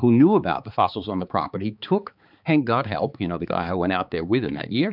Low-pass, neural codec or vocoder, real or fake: 5.4 kHz; codec, 16 kHz, 4 kbps, X-Codec, HuBERT features, trained on balanced general audio; fake